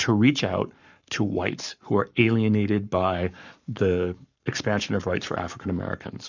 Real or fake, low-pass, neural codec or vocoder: fake; 7.2 kHz; codec, 44.1 kHz, 7.8 kbps, Pupu-Codec